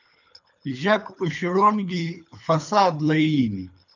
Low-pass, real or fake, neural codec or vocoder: 7.2 kHz; fake; codec, 24 kHz, 3 kbps, HILCodec